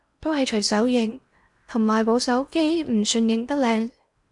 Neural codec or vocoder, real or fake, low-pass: codec, 16 kHz in and 24 kHz out, 0.8 kbps, FocalCodec, streaming, 65536 codes; fake; 10.8 kHz